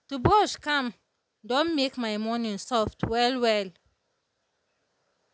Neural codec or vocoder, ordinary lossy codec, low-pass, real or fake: none; none; none; real